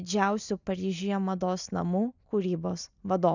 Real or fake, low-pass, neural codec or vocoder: real; 7.2 kHz; none